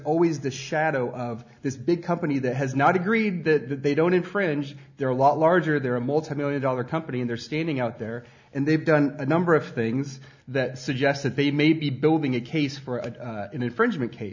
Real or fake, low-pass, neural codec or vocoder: real; 7.2 kHz; none